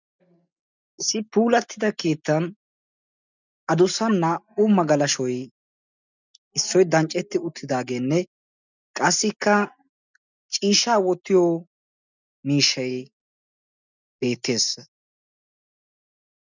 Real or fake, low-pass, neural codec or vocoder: real; 7.2 kHz; none